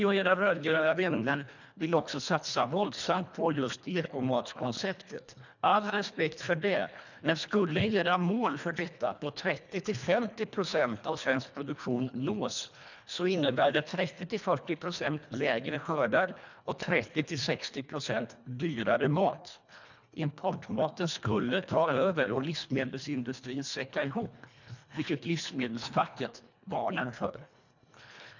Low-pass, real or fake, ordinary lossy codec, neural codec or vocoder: 7.2 kHz; fake; none; codec, 24 kHz, 1.5 kbps, HILCodec